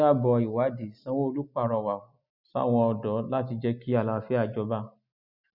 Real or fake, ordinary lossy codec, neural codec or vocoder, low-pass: real; none; none; 5.4 kHz